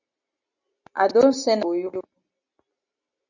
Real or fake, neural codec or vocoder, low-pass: real; none; 7.2 kHz